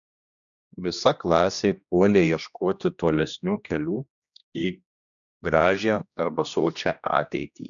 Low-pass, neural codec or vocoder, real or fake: 7.2 kHz; codec, 16 kHz, 1 kbps, X-Codec, HuBERT features, trained on general audio; fake